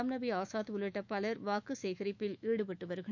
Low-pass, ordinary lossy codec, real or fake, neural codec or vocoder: 7.2 kHz; none; fake; autoencoder, 48 kHz, 128 numbers a frame, DAC-VAE, trained on Japanese speech